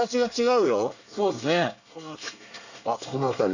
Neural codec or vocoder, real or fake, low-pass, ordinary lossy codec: codec, 24 kHz, 1 kbps, SNAC; fake; 7.2 kHz; none